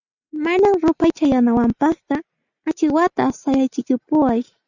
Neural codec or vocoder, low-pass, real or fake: none; 7.2 kHz; real